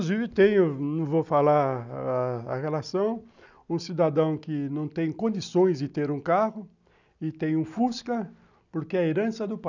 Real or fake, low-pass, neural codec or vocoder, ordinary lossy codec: real; 7.2 kHz; none; none